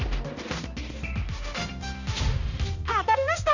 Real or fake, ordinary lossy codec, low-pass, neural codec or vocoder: fake; none; 7.2 kHz; codec, 16 kHz, 1 kbps, X-Codec, HuBERT features, trained on balanced general audio